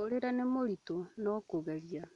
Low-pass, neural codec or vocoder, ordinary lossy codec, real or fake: 10.8 kHz; none; none; real